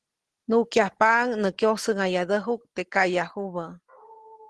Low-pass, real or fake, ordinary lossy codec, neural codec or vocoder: 10.8 kHz; real; Opus, 16 kbps; none